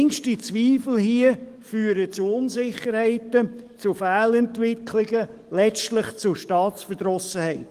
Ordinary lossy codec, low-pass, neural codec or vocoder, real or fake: Opus, 24 kbps; 14.4 kHz; codec, 44.1 kHz, 7.8 kbps, DAC; fake